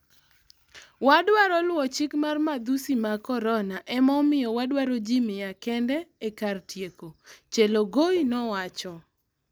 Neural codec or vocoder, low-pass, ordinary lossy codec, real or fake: none; none; none; real